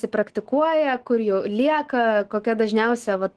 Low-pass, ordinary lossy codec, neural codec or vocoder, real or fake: 10.8 kHz; Opus, 16 kbps; autoencoder, 48 kHz, 128 numbers a frame, DAC-VAE, trained on Japanese speech; fake